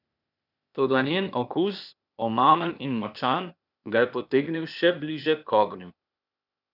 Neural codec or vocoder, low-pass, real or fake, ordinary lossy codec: codec, 16 kHz, 0.8 kbps, ZipCodec; 5.4 kHz; fake; none